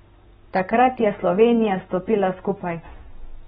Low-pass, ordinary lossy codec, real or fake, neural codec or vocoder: 19.8 kHz; AAC, 16 kbps; real; none